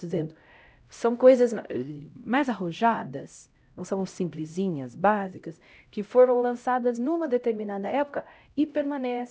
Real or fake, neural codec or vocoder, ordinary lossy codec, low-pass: fake; codec, 16 kHz, 0.5 kbps, X-Codec, HuBERT features, trained on LibriSpeech; none; none